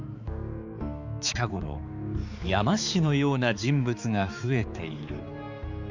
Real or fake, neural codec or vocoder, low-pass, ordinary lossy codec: fake; codec, 16 kHz, 4 kbps, X-Codec, HuBERT features, trained on balanced general audio; 7.2 kHz; Opus, 64 kbps